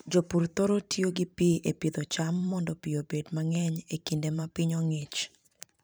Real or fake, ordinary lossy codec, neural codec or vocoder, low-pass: fake; none; vocoder, 44.1 kHz, 128 mel bands every 512 samples, BigVGAN v2; none